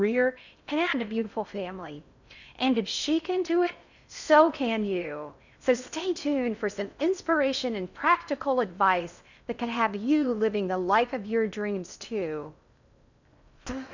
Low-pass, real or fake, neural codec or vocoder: 7.2 kHz; fake; codec, 16 kHz in and 24 kHz out, 0.6 kbps, FocalCodec, streaming, 4096 codes